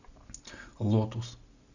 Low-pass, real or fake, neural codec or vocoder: 7.2 kHz; real; none